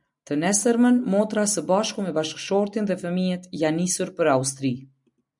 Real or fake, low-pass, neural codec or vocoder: real; 10.8 kHz; none